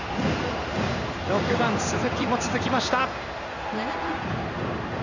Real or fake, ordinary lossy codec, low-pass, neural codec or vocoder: fake; none; 7.2 kHz; codec, 16 kHz in and 24 kHz out, 1 kbps, XY-Tokenizer